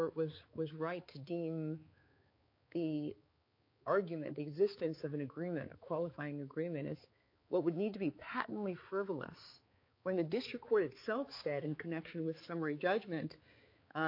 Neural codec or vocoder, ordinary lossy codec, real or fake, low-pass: codec, 16 kHz, 4 kbps, X-Codec, HuBERT features, trained on balanced general audio; MP3, 32 kbps; fake; 5.4 kHz